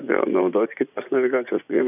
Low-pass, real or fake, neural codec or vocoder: 3.6 kHz; fake; vocoder, 24 kHz, 100 mel bands, Vocos